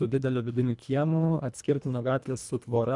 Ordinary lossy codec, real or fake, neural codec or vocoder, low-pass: MP3, 96 kbps; fake; codec, 24 kHz, 1.5 kbps, HILCodec; 10.8 kHz